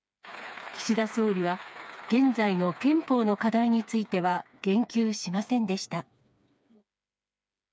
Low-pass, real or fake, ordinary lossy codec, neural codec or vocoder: none; fake; none; codec, 16 kHz, 4 kbps, FreqCodec, smaller model